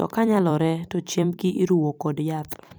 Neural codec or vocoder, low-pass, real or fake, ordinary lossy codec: vocoder, 44.1 kHz, 128 mel bands every 256 samples, BigVGAN v2; none; fake; none